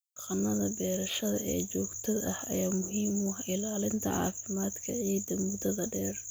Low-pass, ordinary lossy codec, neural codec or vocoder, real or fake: none; none; none; real